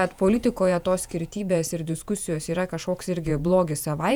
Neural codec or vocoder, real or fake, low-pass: vocoder, 48 kHz, 128 mel bands, Vocos; fake; 19.8 kHz